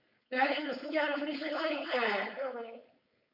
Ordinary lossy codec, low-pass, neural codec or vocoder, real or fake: MP3, 48 kbps; 5.4 kHz; codec, 16 kHz, 4.8 kbps, FACodec; fake